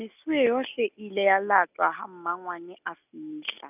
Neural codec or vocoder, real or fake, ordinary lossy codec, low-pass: none; real; none; 3.6 kHz